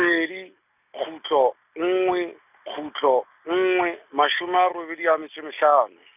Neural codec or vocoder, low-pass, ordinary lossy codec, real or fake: none; 3.6 kHz; none; real